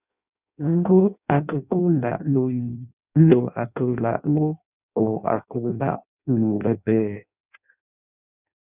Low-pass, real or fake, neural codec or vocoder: 3.6 kHz; fake; codec, 16 kHz in and 24 kHz out, 0.6 kbps, FireRedTTS-2 codec